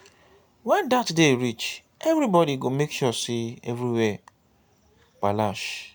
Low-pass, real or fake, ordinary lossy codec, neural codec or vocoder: none; real; none; none